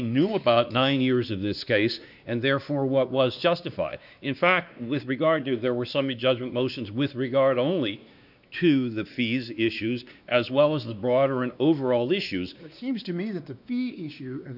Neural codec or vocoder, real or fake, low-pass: codec, 16 kHz, 2 kbps, X-Codec, WavLM features, trained on Multilingual LibriSpeech; fake; 5.4 kHz